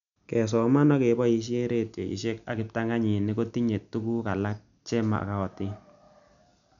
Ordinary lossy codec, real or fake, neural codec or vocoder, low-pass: none; real; none; 7.2 kHz